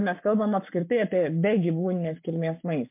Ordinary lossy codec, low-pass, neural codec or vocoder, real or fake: MP3, 32 kbps; 3.6 kHz; codec, 44.1 kHz, 7.8 kbps, Pupu-Codec; fake